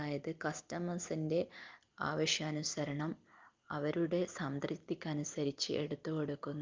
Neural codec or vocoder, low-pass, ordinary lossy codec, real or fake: none; 7.2 kHz; Opus, 24 kbps; real